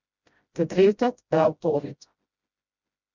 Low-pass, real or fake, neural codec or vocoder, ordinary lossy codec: 7.2 kHz; fake; codec, 16 kHz, 0.5 kbps, FreqCodec, smaller model; Opus, 64 kbps